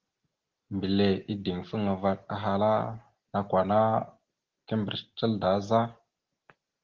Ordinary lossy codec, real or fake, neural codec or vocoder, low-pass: Opus, 16 kbps; real; none; 7.2 kHz